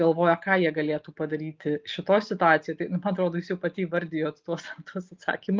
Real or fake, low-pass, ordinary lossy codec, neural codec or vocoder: real; 7.2 kHz; Opus, 32 kbps; none